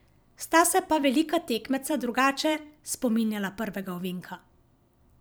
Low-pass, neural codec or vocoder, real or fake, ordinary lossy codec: none; vocoder, 44.1 kHz, 128 mel bands every 256 samples, BigVGAN v2; fake; none